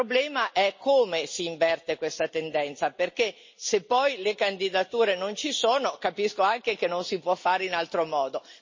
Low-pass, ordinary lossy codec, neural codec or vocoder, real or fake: 7.2 kHz; MP3, 32 kbps; none; real